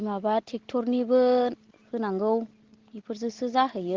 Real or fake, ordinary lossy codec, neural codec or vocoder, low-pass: real; Opus, 16 kbps; none; 7.2 kHz